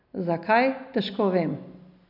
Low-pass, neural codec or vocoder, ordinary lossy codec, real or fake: 5.4 kHz; none; none; real